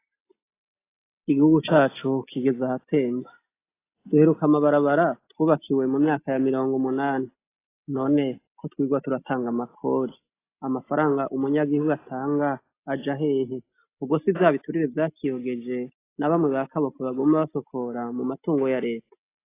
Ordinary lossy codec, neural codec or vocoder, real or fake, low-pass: AAC, 24 kbps; none; real; 3.6 kHz